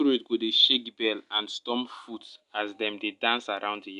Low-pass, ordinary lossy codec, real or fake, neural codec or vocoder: 10.8 kHz; none; real; none